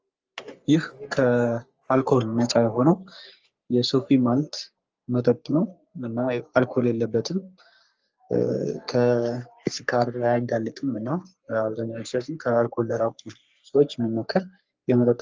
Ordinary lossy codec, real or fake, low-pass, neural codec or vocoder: Opus, 24 kbps; fake; 7.2 kHz; codec, 44.1 kHz, 3.4 kbps, Pupu-Codec